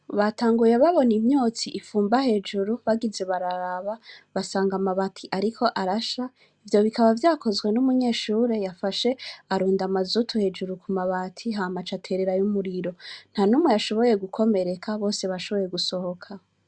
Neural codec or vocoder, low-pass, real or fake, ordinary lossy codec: none; 9.9 kHz; real; Opus, 64 kbps